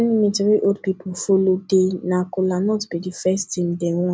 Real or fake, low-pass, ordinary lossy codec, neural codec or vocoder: real; none; none; none